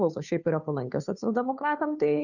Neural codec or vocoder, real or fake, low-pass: codec, 16 kHz, 2 kbps, FunCodec, trained on Chinese and English, 25 frames a second; fake; 7.2 kHz